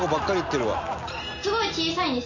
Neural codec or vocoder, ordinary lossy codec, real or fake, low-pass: none; none; real; 7.2 kHz